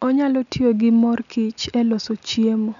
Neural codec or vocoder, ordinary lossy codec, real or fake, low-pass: none; none; real; 7.2 kHz